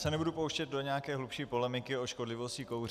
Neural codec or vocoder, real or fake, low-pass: none; real; 14.4 kHz